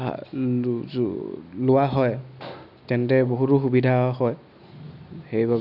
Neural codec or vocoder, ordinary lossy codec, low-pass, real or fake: none; none; 5.4 kHz; real